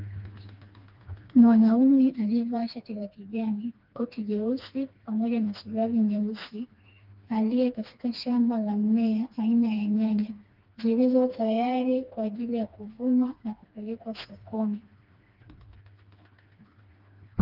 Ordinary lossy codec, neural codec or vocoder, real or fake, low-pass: Opus, 24 kbps; codec, 16 kHz, 2 kbps, FreqCodec, smaller model; fake; 5.4 kHz